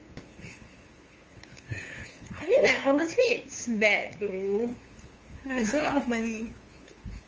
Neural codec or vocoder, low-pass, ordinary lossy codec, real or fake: codec, 16 kHz, 2 kbps, FunCodec, trained on LibriTTS, 25 frames a second; 7.2 kHz; Opus, 24 kbps; fake